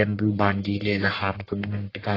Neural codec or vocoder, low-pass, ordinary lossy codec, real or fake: codec, 44.1 kHz, 1.7 kbps, Pupu-Codec; 5.4 kHz; AAC, 24 kbps; fake